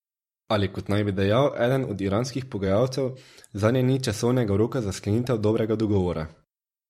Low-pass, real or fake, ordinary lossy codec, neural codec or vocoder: 19.8 kHz; fake; MP3, 64 kbps; vocoder, 44.1 kHz, 128 mel bands every 512 samples, BigVGAN v2